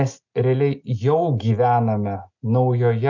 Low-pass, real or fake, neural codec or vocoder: 7.2 kHz; real; none